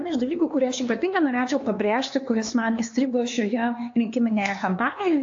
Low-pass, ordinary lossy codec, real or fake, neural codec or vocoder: 7.2 kHz; AAC, 48 kbps; fake; codec, 16 kHz, 2 kbps, X-Codec, HuBERT features, trained on LibriSpeech